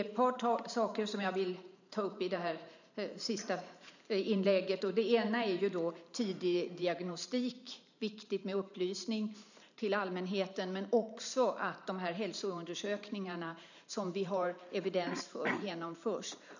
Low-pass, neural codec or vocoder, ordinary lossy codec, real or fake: 7.2 kHz; vocoder, 44.1 kHz, 128 mel bands every 512 samples, BigVGAN v2; none; fake